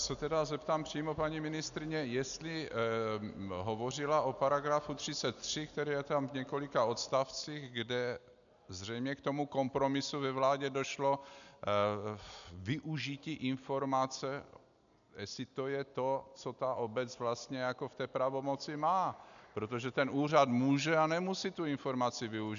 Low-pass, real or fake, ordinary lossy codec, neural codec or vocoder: 7.2 kHz; real; MP3, 96 kbps; none